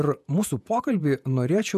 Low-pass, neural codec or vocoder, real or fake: 14.4 kHz; vocoder, 48 kHz, 128 mel bands, Vocos; fake